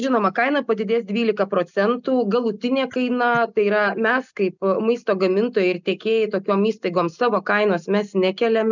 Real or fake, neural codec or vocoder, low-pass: real; none; 7.2 kHz